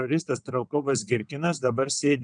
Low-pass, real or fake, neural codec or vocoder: 9.9 kHz; fake; vocoder, 22.05 kHz, 80 mel bands, WaveNeXt